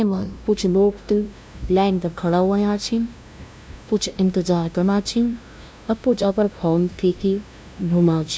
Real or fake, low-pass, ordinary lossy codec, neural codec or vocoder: fake; none; none; codec, 16 kHz, 0.5 kbps, FunCodec, trained on LibriTTS, 25 frames a second